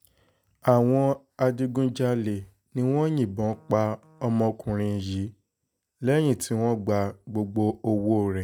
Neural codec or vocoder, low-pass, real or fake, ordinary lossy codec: none; none; real; none